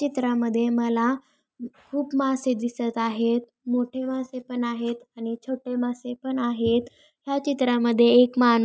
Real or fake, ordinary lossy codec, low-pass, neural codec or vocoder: real; none; none; none